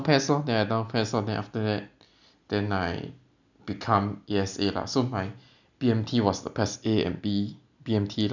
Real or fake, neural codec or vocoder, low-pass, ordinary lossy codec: real; none; 7.2 kHz; none